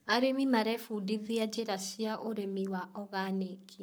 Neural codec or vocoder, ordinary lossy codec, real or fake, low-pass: codec, 44.1 kHz, 7.8 kbps, Pupu-Codec; none; fake; none